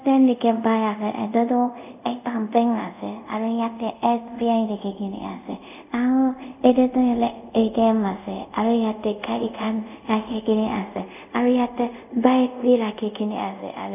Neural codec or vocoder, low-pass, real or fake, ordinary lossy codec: codec, 24 kHz, 0.5 kbps, DualCodec; 3.6 kHz; fake; none